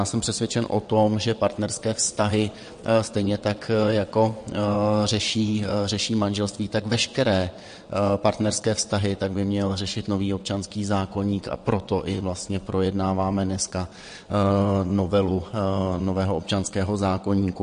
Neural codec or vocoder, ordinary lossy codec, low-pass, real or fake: vocoder, 22.05 kHz, 80 mel bands, WaveNeXt; MP3, 48 kbps; 9.9 kHz; fake